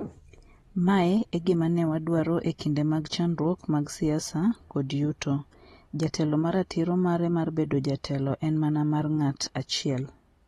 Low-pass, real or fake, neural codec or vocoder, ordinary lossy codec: 19.8 kHz; real; none; AAC, 32 kbps